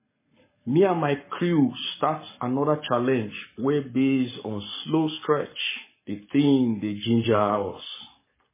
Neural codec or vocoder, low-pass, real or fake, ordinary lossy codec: codec, 44.1 kHz, 7.8 kbps, Pupu-Codec; 3.6 kHz; fake; MP3, 16 kbps